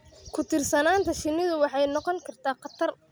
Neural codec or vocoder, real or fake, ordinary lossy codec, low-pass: none; real; none; none